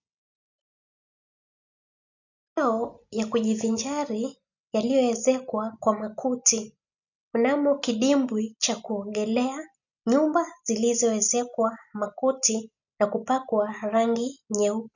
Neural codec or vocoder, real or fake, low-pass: none; real; 7.2 kHz